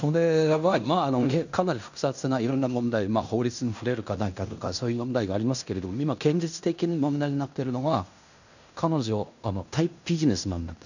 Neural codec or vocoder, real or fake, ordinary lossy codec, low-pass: codec, 16 kHz in and 24 kHz out, 0.9 kbps, LongCat-Audio-Codec, fine tuned four codebook decoder; fake; none; 7.2 kHz